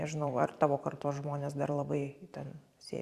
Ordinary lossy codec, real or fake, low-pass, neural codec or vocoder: Opus, 64 kbps; fake; 14.4 kHz; vocoder, 44.1 kHz, 128 mel bands every 512 samples, BigVGAN v2